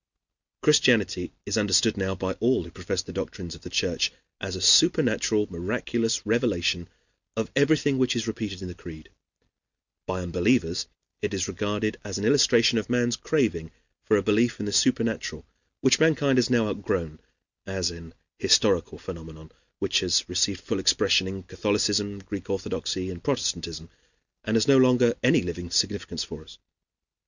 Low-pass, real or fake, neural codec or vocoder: 7.2 kHz; real; none